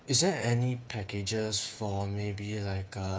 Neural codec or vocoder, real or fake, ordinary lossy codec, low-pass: codec, 16 kHz, 8 kbps, FreqCodec, smaller model; fake; none; none